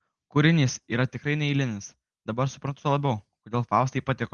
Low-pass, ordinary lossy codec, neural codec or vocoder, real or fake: 10.8 kHz; Opus, 24 kbps; none; real